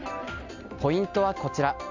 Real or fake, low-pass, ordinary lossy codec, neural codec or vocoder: real; 7.2 kHz; MP3, 64 kbps; none